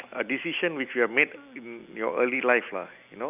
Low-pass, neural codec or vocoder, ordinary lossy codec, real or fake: 3.6 kHz; none; none; real